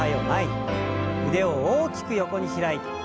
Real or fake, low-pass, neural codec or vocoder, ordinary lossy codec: real; none; none; none